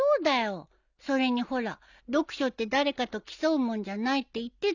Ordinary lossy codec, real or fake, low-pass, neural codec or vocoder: none; real; 7.2 kHz; none